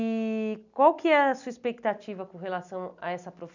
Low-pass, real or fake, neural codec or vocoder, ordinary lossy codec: 7.2 kHz; real; none; none